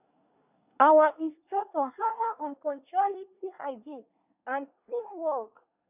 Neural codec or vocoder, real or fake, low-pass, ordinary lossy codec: codec, 24 kHz, 1 kbps, SNAC; fake; 3.6 kHz; none